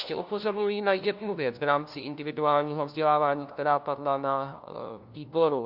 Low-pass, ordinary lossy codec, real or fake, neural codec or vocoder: 5.4 kHz; MP3, 48 kbps; fake; codec, 16 kHz, 1 kbps, FunCodec, trained on LibriTTS, 50 frames a second